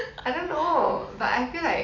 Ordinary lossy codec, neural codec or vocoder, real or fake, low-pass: none; none; real; 7.2 kHz